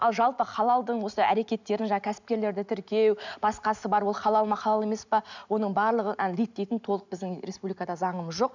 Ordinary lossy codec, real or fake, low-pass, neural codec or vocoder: none; real; 7.2 kHz; none